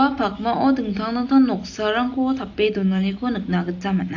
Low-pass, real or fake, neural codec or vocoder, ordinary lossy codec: 7.2 kHz; real; none; AAC, 48 kbps